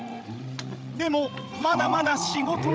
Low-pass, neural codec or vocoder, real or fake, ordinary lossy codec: none; codec, 16 kHz, 16 kbps, FreqCodec, larger model; fake; none